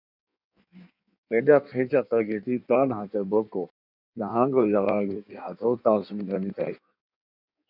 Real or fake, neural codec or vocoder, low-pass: fake; codec, 16 kHz in and 24 kHz out, 1.1 kbps, FireRedTTS-2 codec; 5.4 kHz